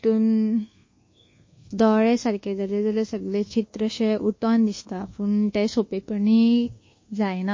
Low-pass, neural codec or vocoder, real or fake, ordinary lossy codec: 7.2 kHz; codec, 24 kHz, 1.2 kbps, DualCodec; fake; MP3, 32 kbps